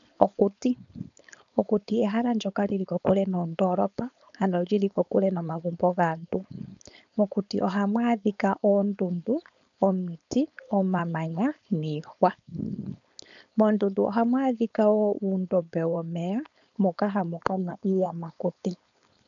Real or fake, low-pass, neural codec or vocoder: fake; 7.2 kHz; codec, 16 kHz, 4.8 kbps, FACodec